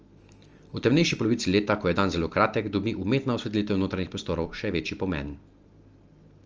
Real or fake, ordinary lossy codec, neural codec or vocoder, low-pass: real; Opus, 24 kbps; none; 7.2 kHz